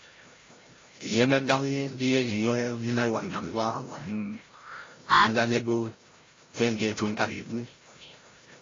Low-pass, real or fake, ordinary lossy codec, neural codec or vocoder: 7.2 kHz; fake; AAC, 32 kbps; codec, 16 kHz, 0.5 kbps, FreqCodec, larger model